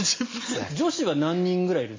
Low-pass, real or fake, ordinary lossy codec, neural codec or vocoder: 7.2 kHz; real; MP3, 32 kbps; none